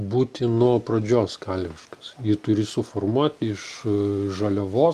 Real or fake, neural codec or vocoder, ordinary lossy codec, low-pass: real; none; Opus, 32 kbps; 14.4 kHz